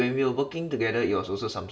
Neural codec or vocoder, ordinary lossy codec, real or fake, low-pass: none; none; real; none